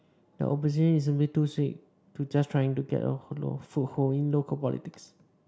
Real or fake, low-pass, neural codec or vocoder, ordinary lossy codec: real; none; none; none